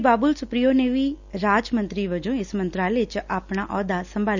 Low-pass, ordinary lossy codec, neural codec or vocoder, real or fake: 7.2 kHz; none; none; real